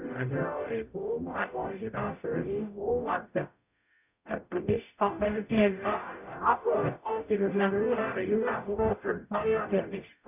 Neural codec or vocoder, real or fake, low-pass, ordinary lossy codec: codec, 44.1 kHz, 0.9 kbps, DAC; fake; 3.6 kHz; none